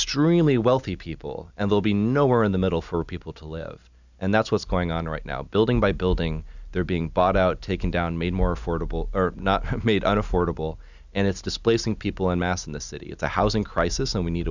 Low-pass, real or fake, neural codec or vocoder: 7.2 kHz; real; none